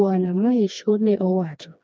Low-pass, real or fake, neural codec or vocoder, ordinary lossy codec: none; fake; codec, 16 kHz, 2 kbps, FreqCodec, smaller model; none